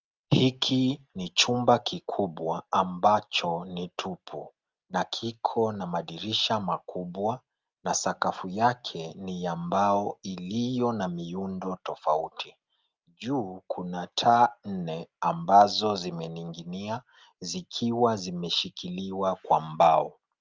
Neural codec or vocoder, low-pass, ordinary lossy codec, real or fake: none; 7.2 kHz; Opus, 24 kbps; real